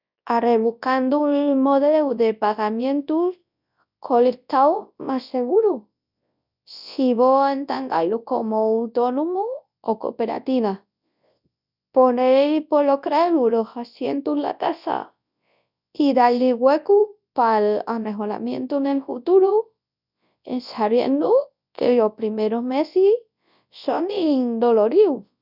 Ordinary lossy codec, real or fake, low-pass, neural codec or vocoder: none; fake; 5.4 kHz; codec, 24 kHz, 0.9 kbps, WavTokenizer, large speech release